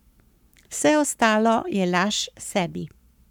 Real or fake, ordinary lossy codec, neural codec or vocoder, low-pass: fake; none; codec, 44.1 kHz, 7.8 kbps, Pupu-Codec; 19.8 kHz